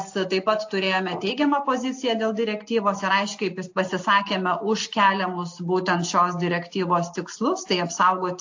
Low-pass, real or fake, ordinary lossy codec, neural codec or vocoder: 7.2 kHz; real; AAC, 48 kbps; none